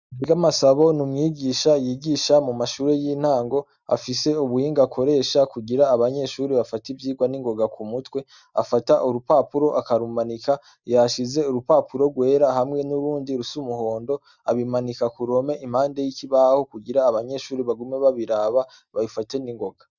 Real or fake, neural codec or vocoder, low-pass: real; none; 7.2 kHz